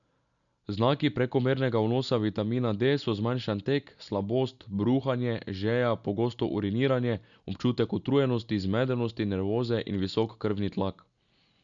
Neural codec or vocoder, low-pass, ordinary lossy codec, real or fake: none; 7.2 kHz; none; real